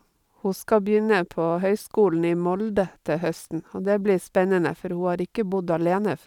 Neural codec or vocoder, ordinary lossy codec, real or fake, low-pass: vocoder, 44.1 kHz, 128 mel bands every 512 samples, BigVGAN v2; none; fake; 19.8 kHz